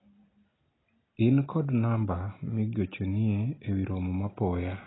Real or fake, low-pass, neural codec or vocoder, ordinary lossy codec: real; 7.2 kHz; none; AAC, 16 kbps